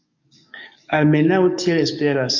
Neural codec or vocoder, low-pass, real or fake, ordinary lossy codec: codec, 44.1 kHz, 7.8 kbps, DAC; 7.2 kHz; fake; MP3, 64 kbps